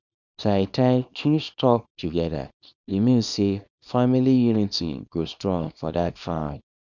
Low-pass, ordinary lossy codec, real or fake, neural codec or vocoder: 7.2 kHz; none; fake; codec, 24 kHz, 0.9 kbps, WavTokenizer, small release